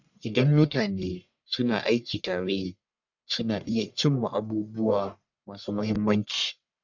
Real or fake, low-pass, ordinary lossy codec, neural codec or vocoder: fake; 7.2 kHz; none; codec, 44.1 kHz, 1.7 kbps, Pupu-Codec